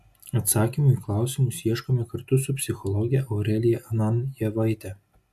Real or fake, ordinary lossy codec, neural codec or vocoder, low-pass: real; AAC, 96 kbps; none; 14.4 kHz